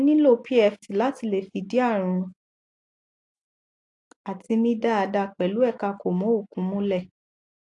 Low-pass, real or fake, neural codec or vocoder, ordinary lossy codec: 10.8 kHz; real; none; AAC, 48 kbps